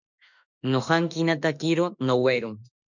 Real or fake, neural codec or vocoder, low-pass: fake; autoencoder, 48 kHz, 32 numbers a frame, DAC-VAE, trained on Japanese speech; 7.2 kHz